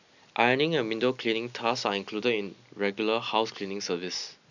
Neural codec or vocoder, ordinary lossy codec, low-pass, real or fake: none; none; 7.2 kHz; real